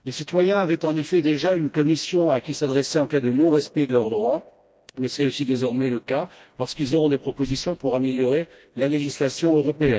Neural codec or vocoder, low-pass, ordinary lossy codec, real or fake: codec, 16 kHz, 1 kbps, FreqCodec, smaller model; none; none; fake